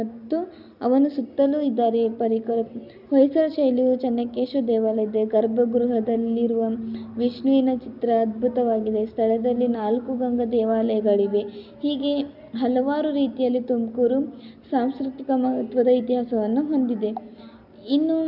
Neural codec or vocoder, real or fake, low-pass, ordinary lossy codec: none; real; 5.4 kHz; none